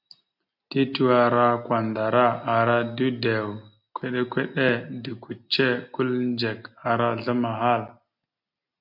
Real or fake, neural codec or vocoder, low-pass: real; none; 5.4 kHz